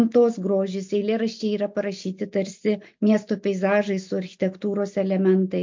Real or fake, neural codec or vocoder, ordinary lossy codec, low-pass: real; none; MP3, 48 kbps; 7.2 kHz